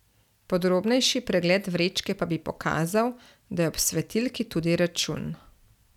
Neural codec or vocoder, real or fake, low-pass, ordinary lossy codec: none; real; 19.8 kHz; none